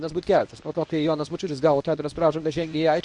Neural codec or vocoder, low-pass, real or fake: codec, 24 kHz, 0.9 kbps, WavTokenizer, medium speech release version 1; 10.8 kHz; fake